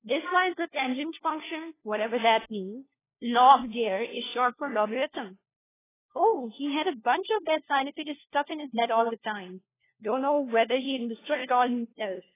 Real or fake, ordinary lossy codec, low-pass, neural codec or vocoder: fake; AAC, 16 kbps; 3.6 kHz; codec, 16 kHz, 1 kbps, FunCodec, trained on LibriTTS, 50 frames a second